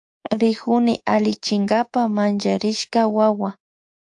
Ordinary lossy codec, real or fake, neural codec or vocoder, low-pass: AAC, 64 kbps; fake; codec, 24 kHz, 3.1 kbps, DualCodec; 10.8 kHz